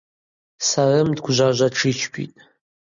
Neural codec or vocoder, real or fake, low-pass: none; real; 7.2 kHz